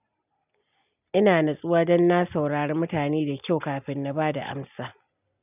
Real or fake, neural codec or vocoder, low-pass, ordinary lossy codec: real; none; 3.6 kHz; none